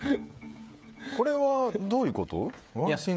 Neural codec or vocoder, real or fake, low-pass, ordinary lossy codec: codec, 16 kHz, 16 kbps, FreqCodec, smaller model; fake; none; none